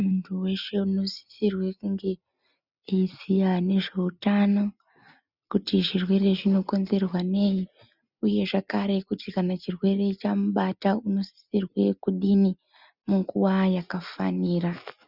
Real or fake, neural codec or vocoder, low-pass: real; none; 5.4 kHz